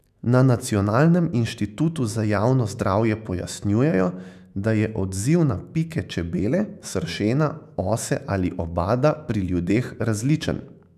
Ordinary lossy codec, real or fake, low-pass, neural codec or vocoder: none; fake; 14.4 kHz; autoencoder, 48 kHz, 128 numbers a frame, DAC-VAE, trained on Japanese speech